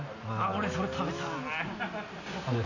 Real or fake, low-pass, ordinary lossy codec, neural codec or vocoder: real; 7.2 kHz; none; none